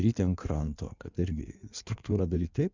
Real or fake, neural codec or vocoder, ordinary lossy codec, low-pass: fake; codec, 16 kHz in and 24 kHz out, 1.1 kbps, FireRedTTS-2 codec; Opus, 64 kbps; 7.2 kHz